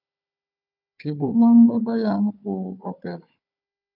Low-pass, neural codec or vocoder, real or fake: 5.4 kHz; codec, 16 kHz, 4 kbps, FunCodec, trained on Chinese and English, 50 frames a second; fake